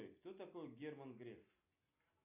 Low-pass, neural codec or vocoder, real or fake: 3.6 kHz; none; real